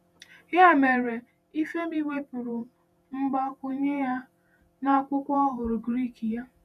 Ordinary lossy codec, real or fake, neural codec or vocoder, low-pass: none; fake; vocoder, 48 kHz, 128 mel bands, Vocos; 14.4 kHz